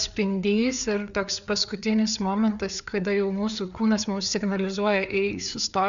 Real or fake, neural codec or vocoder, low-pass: fake; codec, 16 kHz, 4 kbps, FreqCodec, larger model; 7.2 kHz